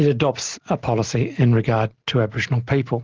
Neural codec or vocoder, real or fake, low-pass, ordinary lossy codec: none; real; 7.2 kHz; Opus, 16 kbps